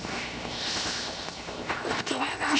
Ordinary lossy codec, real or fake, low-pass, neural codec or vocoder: none; fake; none; codec, 16 kHz, 0.7 kbps, FocalCodec